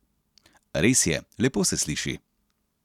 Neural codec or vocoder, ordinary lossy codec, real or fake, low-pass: none; none; real; 19.8 kHz